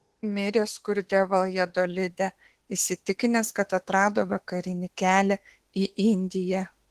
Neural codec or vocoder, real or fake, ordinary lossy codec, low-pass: autoencoder, 48 kHz, 32 numbers a frame, DAC-VAE, trained on Japanese speech; fake; Opus, 16 kbps; 14.4 kHz